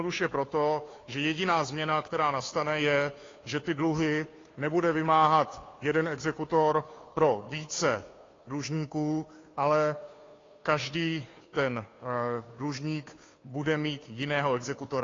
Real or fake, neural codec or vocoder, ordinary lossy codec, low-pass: fake; codec, 16 kHz, 2 kbps, FunCodec, trained on Chinese and English, 25 frames a second; AAC, 32 kbps; 7.2 kHz